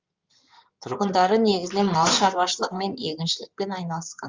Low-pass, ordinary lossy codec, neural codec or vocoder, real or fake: 7.2 kHz; Opus, 32 kbps; codec, 16 kHz in and 24 kHz out, 2.2 kbps, FireRedTTS-2 codec; fake